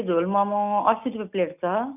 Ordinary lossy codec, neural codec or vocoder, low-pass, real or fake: none; none; 3.6 kHz; real